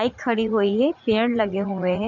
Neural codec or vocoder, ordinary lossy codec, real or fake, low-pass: vocoder, 44.1 kHz, 80 mel bands, Vocos; none; fake; 7.2 kHz